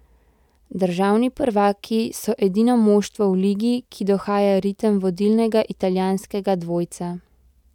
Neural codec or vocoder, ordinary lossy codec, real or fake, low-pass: none; none; real; 19.8 kHz